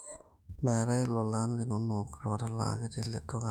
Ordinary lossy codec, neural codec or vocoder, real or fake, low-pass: none; autoencoder, 48 kHz, 32 numbers a frame, DAC-VAE, trained on Japanese speech; fake; 19.8 kHz